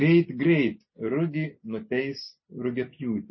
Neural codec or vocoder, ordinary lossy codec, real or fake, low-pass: none; MP3, 24 kbps; real; 7.2 kHz